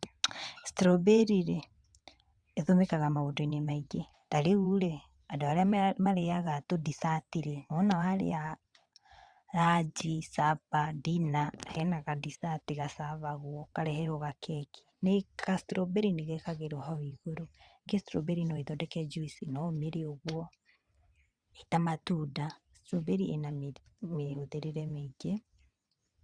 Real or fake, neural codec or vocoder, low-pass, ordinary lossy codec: fake; vocoder, 22.05 kHz, 80 mel bands, WaveNeXt; 9.9 kHz; Opus, 64 kbps